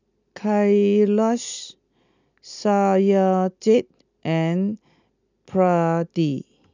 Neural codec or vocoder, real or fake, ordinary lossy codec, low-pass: none; real; none; 7.2 kHz